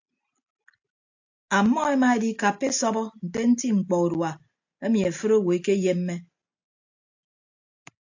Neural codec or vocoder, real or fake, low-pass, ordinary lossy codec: none; real; 7.2 kHz; AAC, 48 kbps